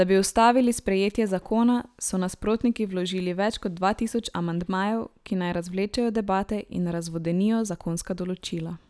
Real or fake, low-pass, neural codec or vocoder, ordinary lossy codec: real; none; none; none